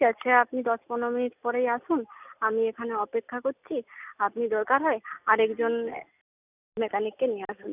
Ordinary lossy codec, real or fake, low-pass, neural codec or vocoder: none; real; 3.6 kHz; none